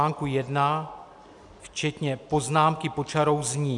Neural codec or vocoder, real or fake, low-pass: none; real; 10.8 kHz